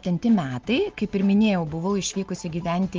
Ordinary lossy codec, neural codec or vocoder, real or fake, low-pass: Opus, 32 kbps; none; real; 7.2 kHz